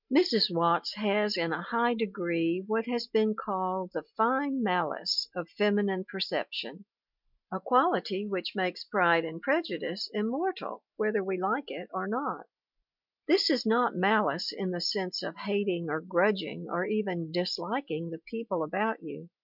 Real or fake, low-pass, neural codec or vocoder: real; 5.4 kHz; none